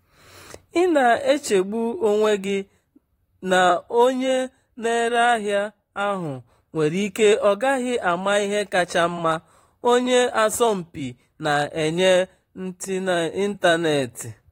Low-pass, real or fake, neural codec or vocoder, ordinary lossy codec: 19.8 kHz; fake; vocoder, 44.1 kHz, 128 mel bands, Pupu-Vocoder; AAC, 48 kbps